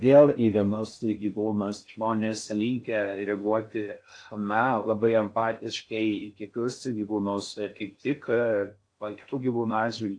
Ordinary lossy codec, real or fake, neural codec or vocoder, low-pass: AAC, 48 kbps; fake; codec, 16 kHz in and 24 kHz out, 0.6 kbps, FocalCodec, streaming, 4096 codes; 9.9 kHz